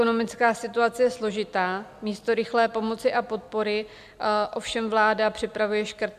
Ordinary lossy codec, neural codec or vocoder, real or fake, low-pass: MP3, 96 kbps; none; real; 14.4 kHz